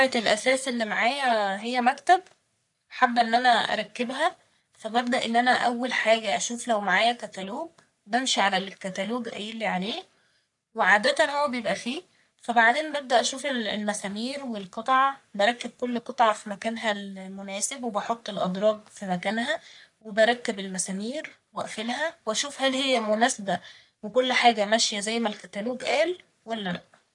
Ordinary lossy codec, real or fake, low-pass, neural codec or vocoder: none; fake; 10.8 kHz; codec, 44.1 kHz, 3.4 kbps, Pupu-Codec